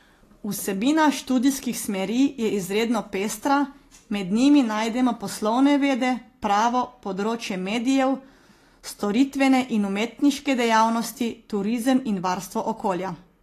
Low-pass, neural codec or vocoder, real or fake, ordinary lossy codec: 14.4 kHz; none; real; AAC, 48 kbps